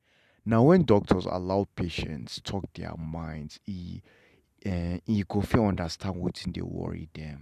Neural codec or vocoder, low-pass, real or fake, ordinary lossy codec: none; 14.4 kHz; real; none